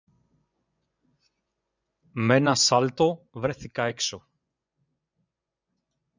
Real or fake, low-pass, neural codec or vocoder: fake; 7.2 kHz; vocoder, 24 kHz, 100 mel bands, Vocos